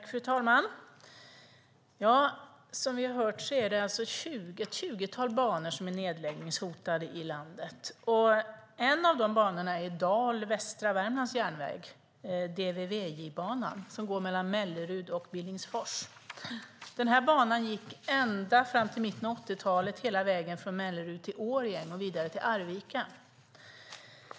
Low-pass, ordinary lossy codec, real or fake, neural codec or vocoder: none; none; real; none